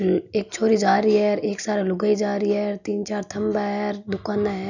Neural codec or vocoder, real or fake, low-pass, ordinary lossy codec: none; real; 7.2 kHz; none